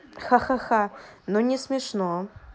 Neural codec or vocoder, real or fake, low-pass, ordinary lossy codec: none; real; none; none